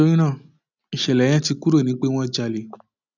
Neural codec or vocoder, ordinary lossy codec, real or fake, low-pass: none; none; real; 7.2 kHz